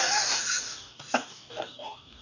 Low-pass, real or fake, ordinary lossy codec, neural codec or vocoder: 7.2 kHz; fake; none; codec, 44.1 kHz, 2.6 kbps, DAC